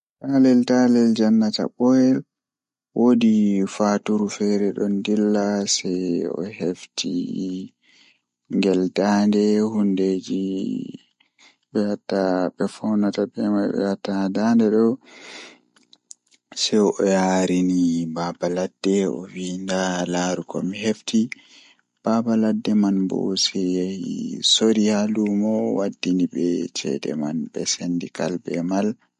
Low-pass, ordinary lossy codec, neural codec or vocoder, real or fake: 14.4 kHz; MP3, 48 kbps; none; real